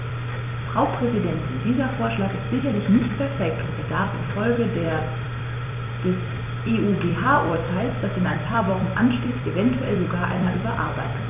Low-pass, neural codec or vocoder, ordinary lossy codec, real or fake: 3.6 kHz; vocoder, 44.1 kHz, 128 mel bands every 256 samples, BigVGAN v2; none; fake